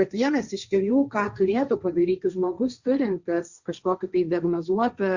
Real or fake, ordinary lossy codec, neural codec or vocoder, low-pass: fake; Opus, 64 kbps; codec, 16 kHz, 1.1 kbps, Voila-Tokenizer; 7.2 kHz